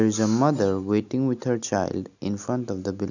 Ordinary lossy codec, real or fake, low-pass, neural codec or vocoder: none; real; 7.2 kHz; none